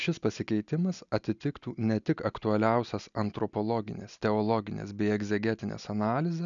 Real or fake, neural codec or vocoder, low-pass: real; none; 7.2 kHz